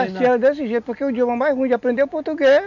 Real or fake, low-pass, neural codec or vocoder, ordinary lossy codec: real; 7.2 kHz; none; none